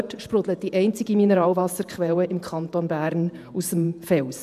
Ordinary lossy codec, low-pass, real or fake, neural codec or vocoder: MP3, 96 kbps; 14.4 kHz; fake; vocoder, 48 kHz, 128 mel bands, Vocos